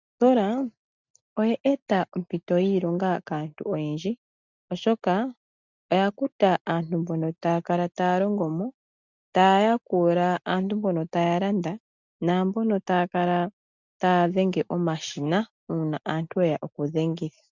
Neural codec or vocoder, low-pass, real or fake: none; 7.2 kHz; real